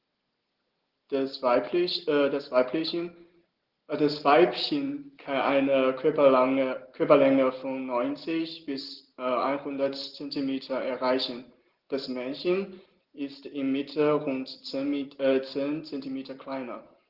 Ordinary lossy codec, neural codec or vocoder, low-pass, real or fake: Opus, 16 kbps; none; 5.4 kHz; real